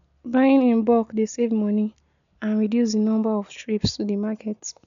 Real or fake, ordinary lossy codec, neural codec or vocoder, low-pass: real; none; none; 7.2 kHz